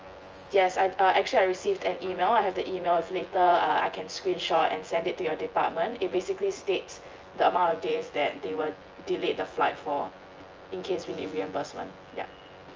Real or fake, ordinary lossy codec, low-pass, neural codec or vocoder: fake; Opus, 24 kbps; 7.2 kHz; vocoder, 24 kHz, 100 mel bands, Vocos